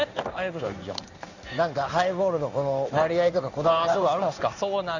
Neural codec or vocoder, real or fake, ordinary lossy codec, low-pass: codec, 16 kHz in and 24 kHz out, 1 kbps, XY-Tokenizer; fake; none; 7.2 kHz